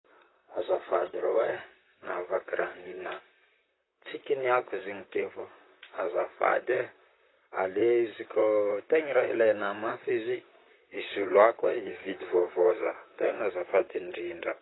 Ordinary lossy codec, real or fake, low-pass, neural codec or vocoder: AAC, 16 kbps; fake; 7.2 kHz; vocoder, 44.1 kHz, 128 mel bands, Pupu-Vocoder